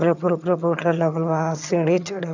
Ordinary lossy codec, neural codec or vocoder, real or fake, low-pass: none; vocoder, 22.05 kHz, 80 mel bands, HiFi-GAN; fake; 7.2 kHz